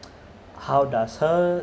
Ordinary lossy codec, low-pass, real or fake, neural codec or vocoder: none; none; real; none